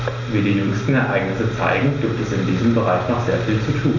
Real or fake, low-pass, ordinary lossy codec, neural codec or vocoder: real; 7.2 kHz; none; none